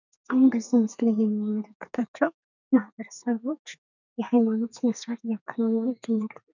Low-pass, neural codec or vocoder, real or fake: 7.2 kHz; codec, 32 kHz, 1.9 kbps, SNAC; fake